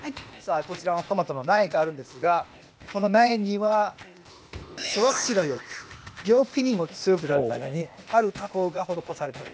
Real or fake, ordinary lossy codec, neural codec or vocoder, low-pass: fake; none; codec, 16 kHz, 0.8 kbps, ZipCodec; none